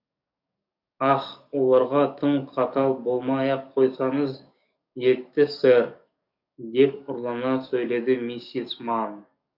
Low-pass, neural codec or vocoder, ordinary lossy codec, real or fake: 5.4 kHz; codec, 44.1 kHz, 7.8 kbps, DAC; none; fake